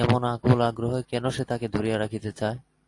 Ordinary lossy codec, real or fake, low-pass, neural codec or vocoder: AAC, 48 kbps; real; 10.8 kHz; none